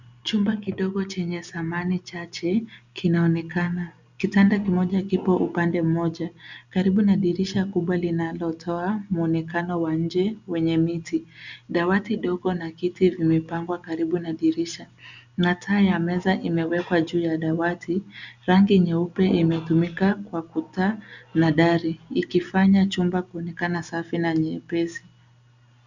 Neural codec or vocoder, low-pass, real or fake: none; 7.2 kHz; real